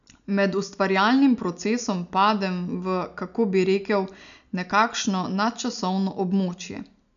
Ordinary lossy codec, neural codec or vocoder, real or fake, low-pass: none; none; real; 7.2 kHz